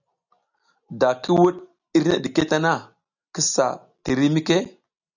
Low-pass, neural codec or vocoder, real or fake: 7.2 kHz; none; real